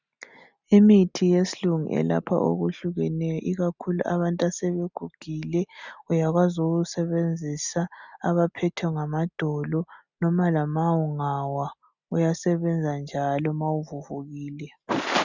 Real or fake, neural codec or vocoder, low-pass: real; none; 7.2 kHz